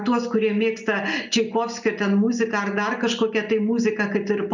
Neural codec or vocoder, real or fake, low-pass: none; real; 7.2 kHz